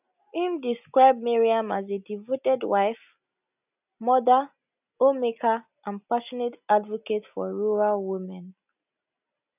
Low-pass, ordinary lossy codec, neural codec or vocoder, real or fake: 3.6 kHz; none; none; real